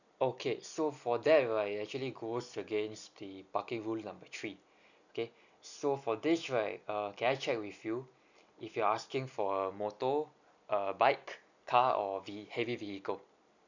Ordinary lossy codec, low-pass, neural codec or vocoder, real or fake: none; 7.2 kHz; none; real